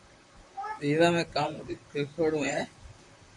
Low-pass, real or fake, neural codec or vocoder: 10.8 kHz; fake; vocoder, 44.1 kHz, 128 mel bands, Pupu-Vocoder